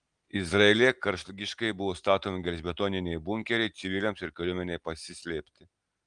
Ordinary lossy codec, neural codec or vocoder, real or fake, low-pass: Opus, 24 kbps; none; real; 9.9 kHz